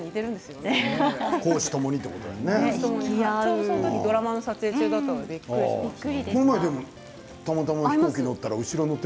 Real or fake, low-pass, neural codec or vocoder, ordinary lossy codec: real; none; none; none